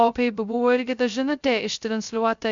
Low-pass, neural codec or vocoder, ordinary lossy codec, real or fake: 7.2 kHz; codec, 16 kHz, 0.2 kbps, FocalCodec; MP3, 64 kbps; fake